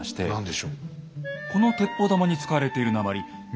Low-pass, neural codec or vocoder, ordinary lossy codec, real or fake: none; none; none; real